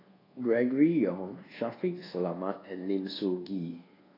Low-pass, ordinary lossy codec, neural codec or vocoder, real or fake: 5.4 kHz; AAC, 24 kbps; codec, 24 kHz, 1.2 kbps, DualCodec; fake